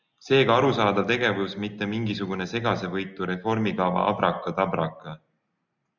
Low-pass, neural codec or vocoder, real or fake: 7.2 kHz; none; real